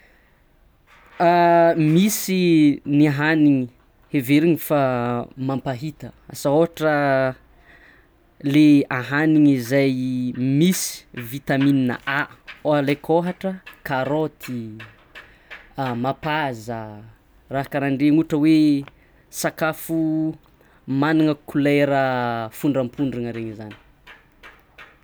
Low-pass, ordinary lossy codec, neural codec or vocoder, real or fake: none; none; none; real